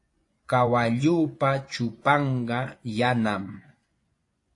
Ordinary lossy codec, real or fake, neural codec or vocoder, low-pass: AAC, 48 kbps; fake; vocoder, 24 kHz, 100 mel bands, Vocos; 10.8 kHz